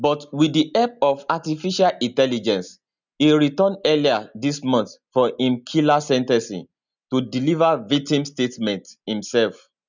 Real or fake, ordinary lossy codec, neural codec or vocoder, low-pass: real; none; none; 7.2 kHz